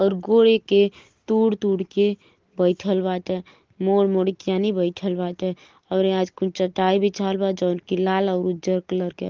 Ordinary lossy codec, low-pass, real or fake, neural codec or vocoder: Opus, 16 kbps; 7.2 kHz; real; none